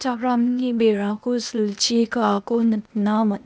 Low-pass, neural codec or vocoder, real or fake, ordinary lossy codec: none; codec, 16 kHz, 0.8 kbps, ZipCodec; fake; none